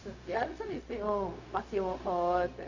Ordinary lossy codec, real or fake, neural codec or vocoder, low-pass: none; fake; codec, 16 kHz, 0.4 kbps, LongCat-Audio-Codec; 7.2 kHz